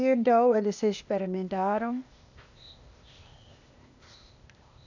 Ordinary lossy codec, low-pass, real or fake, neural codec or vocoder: none; 7.2 kHz; fake; codec, 16 kHz, 0.8 kbps, ZipCodec